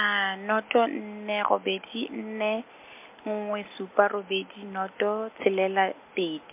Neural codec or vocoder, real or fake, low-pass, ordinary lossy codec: none; real; 3.6 kHz; MP3, 32 kbps